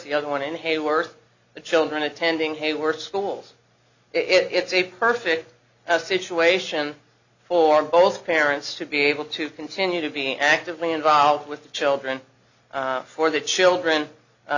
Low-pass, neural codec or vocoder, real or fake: 7.2 kHz; none; real